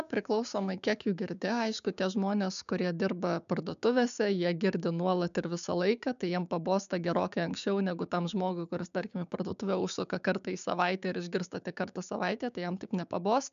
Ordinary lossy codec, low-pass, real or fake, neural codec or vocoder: MP3, 96 kbps; 7.2 kHz; fake; codec, 16 kHz, 6 kbps, DAC